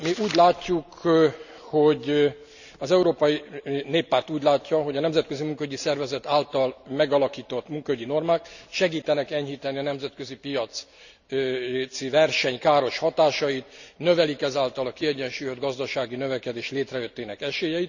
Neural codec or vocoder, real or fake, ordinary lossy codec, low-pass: none; real; none; 7.2 kHz